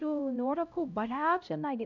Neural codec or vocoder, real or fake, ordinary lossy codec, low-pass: codec, 16 kHz, 0.5 kbps, X-Codec, HuBERT features, trained on LibriSpeech; fake; none; 7.2 kHz